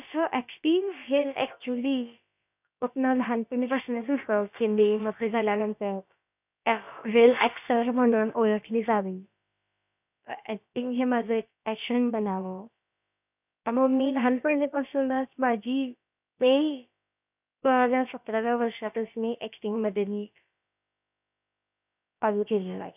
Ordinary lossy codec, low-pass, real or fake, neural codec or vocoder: none; 3.6 kHz; fake; codec, 16 kHz, about 1 kbps, DyCAST, with the encoder's durations